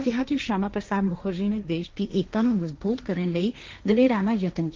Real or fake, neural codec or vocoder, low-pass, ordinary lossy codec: fake; codec, 16 kHz, 1.1 kbps, Voila-Tokenizer; 7.2 kHz; Opus, 32 kbps